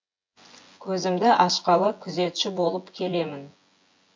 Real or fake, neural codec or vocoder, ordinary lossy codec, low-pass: fake; vocoder, 24 kHz, 100 mel bands, Vocos; MP3, 48 kbps; 7.2 kHz